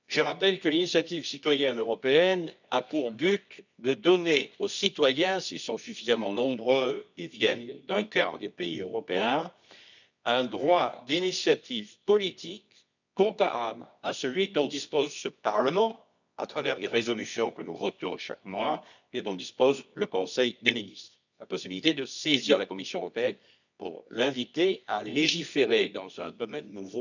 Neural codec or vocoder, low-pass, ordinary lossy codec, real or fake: codec, 24 kHz, 0.9 kbps, WavTokenizer, medium music audio release; 7.2 kHz; none; fake